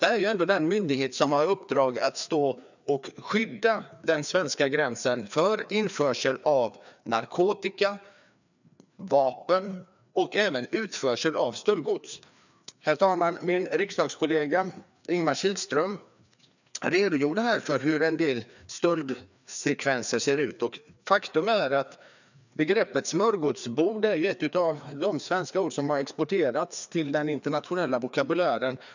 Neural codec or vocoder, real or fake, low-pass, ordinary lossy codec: codec, 16 kHz, 2 kbps, FreqCodec, larger model; fake; 7.2 kHz; none